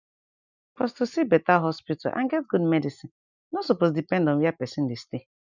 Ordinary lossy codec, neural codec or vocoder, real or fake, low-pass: none; none; real; 7.2 kHz